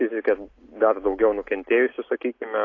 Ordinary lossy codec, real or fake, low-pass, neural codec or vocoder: AAC, 32 kbps; real; 7.2 kHz; none